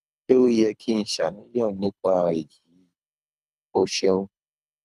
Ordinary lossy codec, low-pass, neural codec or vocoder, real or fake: none; none; codec, 24 kHz, 3 kbps, HILCodec; fake